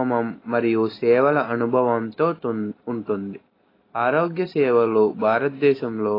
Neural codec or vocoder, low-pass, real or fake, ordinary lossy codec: none; 5.4 kHz; real; AAC, 24 kbps